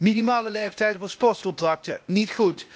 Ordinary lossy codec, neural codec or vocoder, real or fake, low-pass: none; codec, 16 kHz, 0.8 kbps, ZipCodec; fake; none